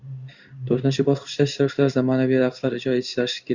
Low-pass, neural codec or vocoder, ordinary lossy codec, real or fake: 7.2 kHz; codec, 16 kHz in and 24 kHz out, 1 kbps, XY-Tokenizer; Opus, 64 kbps; fake